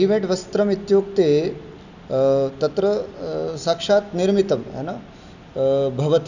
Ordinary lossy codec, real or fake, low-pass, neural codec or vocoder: none; real; 7.2 kHz; none